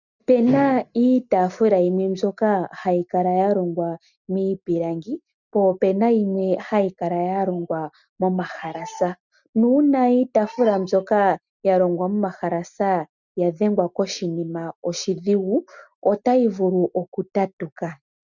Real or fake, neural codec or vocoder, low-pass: real; none; 7.2 kHz